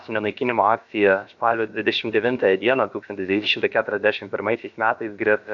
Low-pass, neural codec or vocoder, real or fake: 7.2 kHz; codec, 16 kHz, about 1 kbps, DyCAST, with the encoder's durations; fake